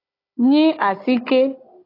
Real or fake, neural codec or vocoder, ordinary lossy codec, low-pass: fake; codec, 16 kHz, 16 kbps, FunCodec, trained on Chinese and English, 50 frames a second; AAC, 24 kbps; 5.4 kHz